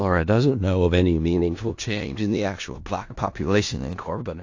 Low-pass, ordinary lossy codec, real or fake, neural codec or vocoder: 7.2 kHz; AAC, 48 kbps; fake; codec, 16 kHz in and 24 kHz out, 0.4 kbps, LongCat-Audio-Codec, four codebook decoder